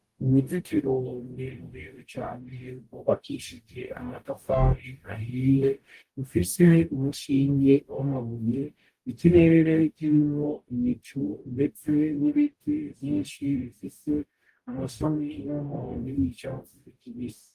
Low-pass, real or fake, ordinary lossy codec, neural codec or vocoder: 14.4 kHz; fake; Opus, 24 kbps; codec, 44.1 kHz, 0.9 kbps, DAC